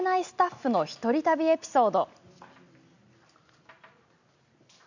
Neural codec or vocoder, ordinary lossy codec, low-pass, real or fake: none; none; 7.2 kHz; real